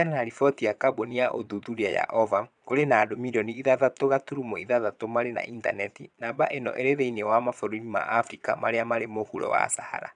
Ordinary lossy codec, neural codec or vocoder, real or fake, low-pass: none; vocoder, 22.05 kHz, 80 mel bands, Vocos; fake; 9.9 kHz